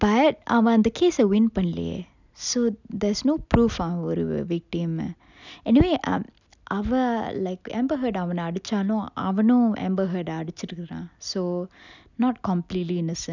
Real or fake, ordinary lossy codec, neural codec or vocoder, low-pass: real; none; none; 7.2 kHz